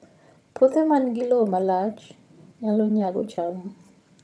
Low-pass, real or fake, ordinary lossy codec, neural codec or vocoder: none; fake; none; vocoder, 22.05 kHz, 80 mel bands, HiFi-GAN